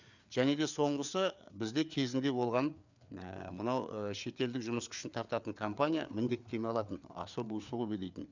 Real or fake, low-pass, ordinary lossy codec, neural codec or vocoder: fake; 7.2 kHz; none; codec, 16 kHz, 4 kbps, FreqCodec, larger model